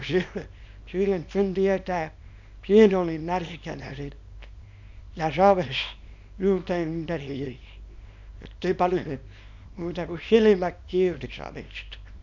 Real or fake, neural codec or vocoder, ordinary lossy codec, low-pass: fake; codec, 24 kHz, 0.9 kbps, WavTokenizer, small release; none; 7.2 kHz